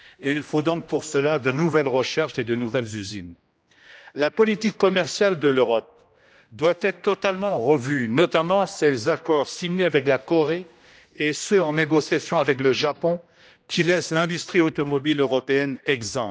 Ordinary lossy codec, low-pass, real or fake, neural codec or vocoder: none; none; fake; codec, 16 kHz, 1 kbps, X-Codec, HuBERT features, trained on general audio